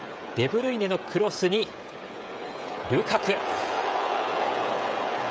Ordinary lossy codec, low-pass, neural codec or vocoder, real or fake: none; none; codec, 16 kHz, 16 kbps, FreqCodec, smaller model; fake